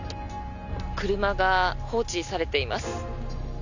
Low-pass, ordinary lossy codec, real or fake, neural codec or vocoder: 7.2 kHz; none; real; none